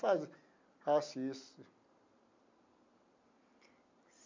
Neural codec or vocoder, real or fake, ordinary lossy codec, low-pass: none; real; MP3, 48 kbps; 7.2 kHz